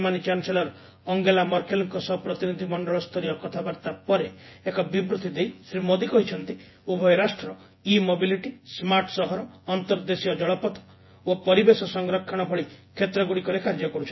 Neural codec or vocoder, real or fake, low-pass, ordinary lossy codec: vocoder, 24 kHz, 100 mel bands, Vocos; fake; 7.2 kHz; MP3, 24 kbps